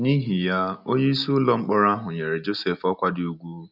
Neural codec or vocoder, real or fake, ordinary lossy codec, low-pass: none; real; none; 5.4 kHz